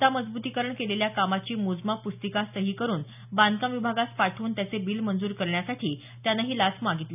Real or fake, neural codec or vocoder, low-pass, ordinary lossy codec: real; none; 3.6 kHz; none